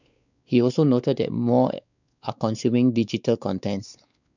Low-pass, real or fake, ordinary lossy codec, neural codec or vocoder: 7.2 kHz; fake; none; codec, 16 kHz, 4 kbps, X-Codec, WavLM features, trained on Multilingual LibriSpeech